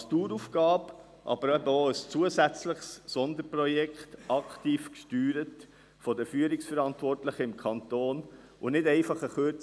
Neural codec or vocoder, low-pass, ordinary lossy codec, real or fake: none; none; none; real